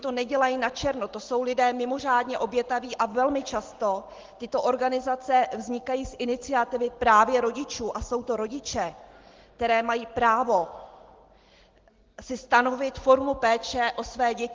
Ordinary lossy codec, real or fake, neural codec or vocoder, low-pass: Opus, 16 kbps; real; none; 7.2 kHz